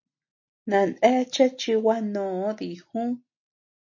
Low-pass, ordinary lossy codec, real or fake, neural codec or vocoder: 7.2 kHz; MP3, 32 kbps; real; none